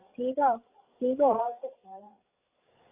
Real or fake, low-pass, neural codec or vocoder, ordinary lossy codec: fake; 3.6 kHz; codec, 16 kHz, 8 kbps, FreqCodec, larger model; AAC, 32 kbps